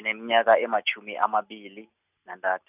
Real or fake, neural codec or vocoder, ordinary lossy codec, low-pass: real; none; none; 3.6 kHz